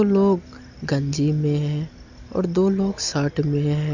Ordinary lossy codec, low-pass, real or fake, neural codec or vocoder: none; 7.2 kHz; real; none